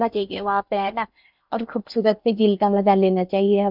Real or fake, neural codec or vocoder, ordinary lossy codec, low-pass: fake; codec, 16 kHz in and 24 kHz out, 0.8 kbps, FocalCodec, streaming, 65536 codes; none; 5.4 kHz